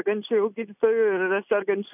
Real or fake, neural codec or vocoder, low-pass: real; none; 3.6 kHz